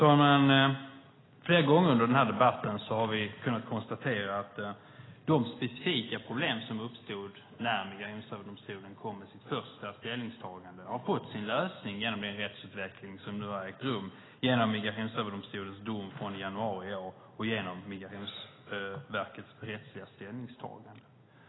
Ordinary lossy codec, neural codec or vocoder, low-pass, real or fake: AAC, 16 kbps; none; 7.2 kHz; real